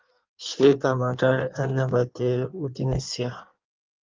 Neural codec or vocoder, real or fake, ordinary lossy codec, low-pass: codec, 16 kHz in and 24 kHz out, 1.1 kbps, FireRedTTS-2 codec; fake; Opus, 24 kbps; 7.2 kHz